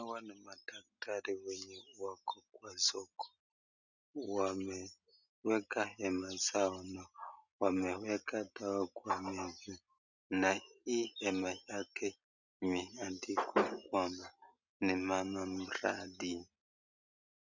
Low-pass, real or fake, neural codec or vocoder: 7.2 kHz; real; none